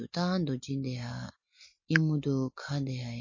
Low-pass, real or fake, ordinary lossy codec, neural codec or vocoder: 7.2 kHz; real; MP3, 32 kbps; none